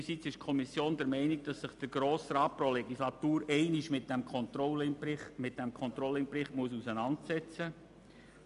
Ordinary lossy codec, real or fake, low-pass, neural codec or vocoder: none; real; 10.8 kHz; none